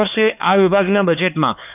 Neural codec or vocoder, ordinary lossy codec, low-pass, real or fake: codec, 16 kHz, 4 kbps, X-Codec, HuBERT features, trained on LibriSpeech; none; 3.6 kHz; fake